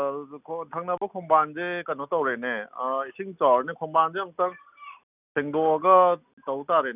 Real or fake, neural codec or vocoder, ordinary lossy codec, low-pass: real; none; none; 3.6 kHz